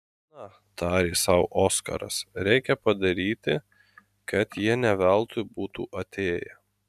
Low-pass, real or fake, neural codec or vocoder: 14.4 kHz; real; none